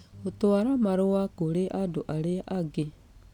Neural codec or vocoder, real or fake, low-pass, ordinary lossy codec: none; real; 19.8 kHz; none